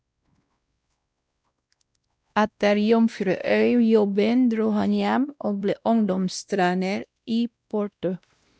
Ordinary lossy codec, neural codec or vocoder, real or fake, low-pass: none; codec, 16 kHz, 1 kbps, X-Codec, WavLM features, trained on Multilingual LibriSpeech; fake; none